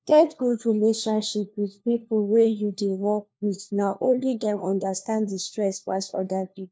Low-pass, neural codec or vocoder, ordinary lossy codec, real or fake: none; codec, 16 kHz, 1 kbps, FunCodec, trained on LibriTTS, 50 frames a second; none; fake